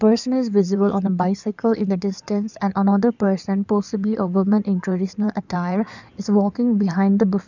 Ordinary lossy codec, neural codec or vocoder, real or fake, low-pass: none; codec, 16 kHz in and 24 kHz out, 2.2 kbps, FireRedTTS-2 codec; fake; 7.2 kHz